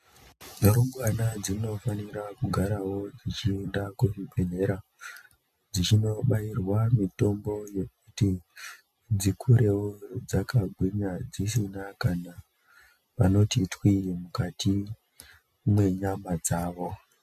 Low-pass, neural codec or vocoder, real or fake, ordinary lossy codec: 14.4 kHz; none; real; MP3, 96 kbps